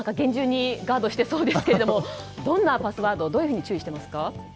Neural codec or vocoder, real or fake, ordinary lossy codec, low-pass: none; real; none; none